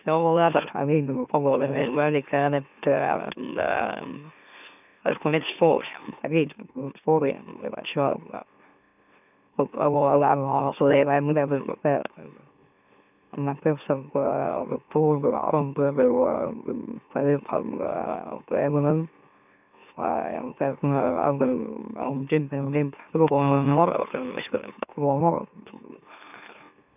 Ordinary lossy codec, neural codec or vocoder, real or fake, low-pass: none; autoencoder, 44.1 kHz, a latent of 192 numbers a frame, MeloTTS; fake; 3.6 kHz